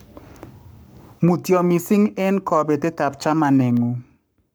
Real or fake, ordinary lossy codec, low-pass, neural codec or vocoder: fake; none; none; codec, 44.1 kHz, 7.8 kbps, Pupu-Codec